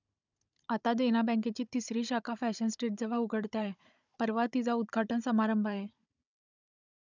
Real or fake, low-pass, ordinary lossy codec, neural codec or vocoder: fake; 7.2 kHz; none; codec, 16 kHz, 16 kbps, FunCodec, trained on Chinese and English, 50 frames a second